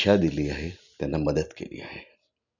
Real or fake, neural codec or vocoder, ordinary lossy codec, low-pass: real; none; none; 7.2 kHz